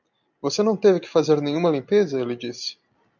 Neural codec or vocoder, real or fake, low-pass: none; real; 7.2 kHz